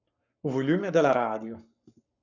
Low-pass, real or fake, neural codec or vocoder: 7.2 kHz; fake; codec, 44.1 kHz, 7.8 kbps, Pupu-Codec